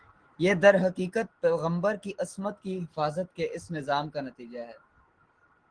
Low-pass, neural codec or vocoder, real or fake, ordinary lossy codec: 9.9 kHz; none; real; Opus, 16 kbps